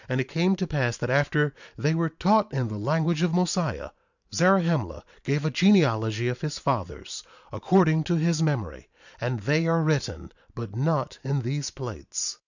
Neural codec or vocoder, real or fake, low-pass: vocoder, 44.1 kHz, 128 mel bands every 512 samples, BigVGAN v2; fake; 7.2 kHz